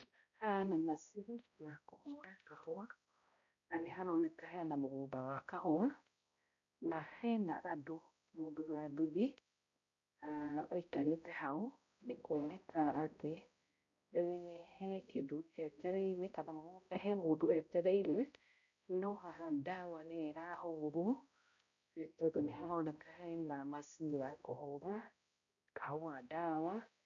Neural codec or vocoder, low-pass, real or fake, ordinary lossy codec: codec, 16 kHz, 0.5 kbps, X-Codec, HuBERT features, trained on balanced general audio; 7.2 kHz; fake; MP3, 48 kbps